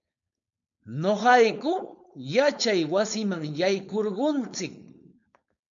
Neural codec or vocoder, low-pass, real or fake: codec, 16 kHz, 4.8 kbps, FACodec; 7.2 kHz; fake